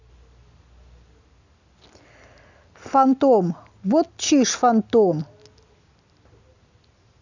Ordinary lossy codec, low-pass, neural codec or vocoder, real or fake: none; 7.2 kHz; none; real